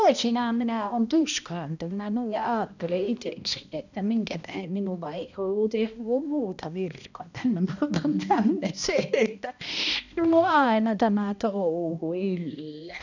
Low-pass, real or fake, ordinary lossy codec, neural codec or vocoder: 7.2 kHz; fake; none; codec, 16 kHz, 1 kbps, X-Codec, HuBERT features, trained on balanced general audio